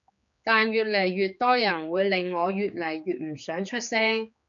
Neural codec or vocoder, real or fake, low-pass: codec, 16 kHz, 4 kbps, X-Codec, HuBERT features, trained on general audio; fake; 7.2 kHz